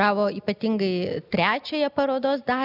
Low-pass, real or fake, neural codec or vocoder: 5.4 kHz; real; none